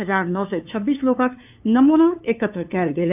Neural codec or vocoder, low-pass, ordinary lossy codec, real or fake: codec, 16 kHz, 2 kbps, FunCodec, trained on LibriTTS, 25 frames a second; 3.6 kHz; AAC, 32 kbps; fake